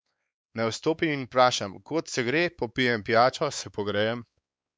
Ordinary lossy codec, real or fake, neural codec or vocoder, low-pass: none; fake; codec, 16 kHz, 4 kbps, X-Codec, WavLM features, trained on Multilingual LibriSpeech; none